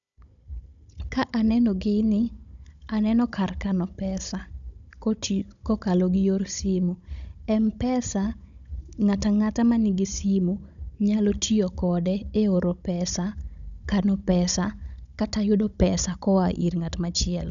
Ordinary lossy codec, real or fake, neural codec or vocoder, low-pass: none; fake; codec, 16 kHz, 16 kbps, FunCodec, trained on Chinese and English, 50 frames a second; 7.2 kHz